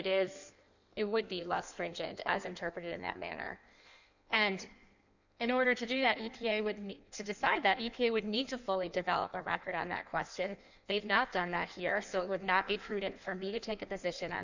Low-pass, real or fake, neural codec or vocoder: 7.2 kHz; fake; codec, 16 kHz in and 24 kHz out, 1.1 kbps, FireRedTTS-2 codec